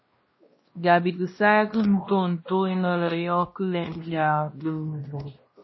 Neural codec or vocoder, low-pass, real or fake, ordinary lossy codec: codec, 16 kHz, 1 kbps, X-Codec, WavLM features, trained on Multilingual LibriSpeech; 7.2 kHz; fake; MP3, 24 kbps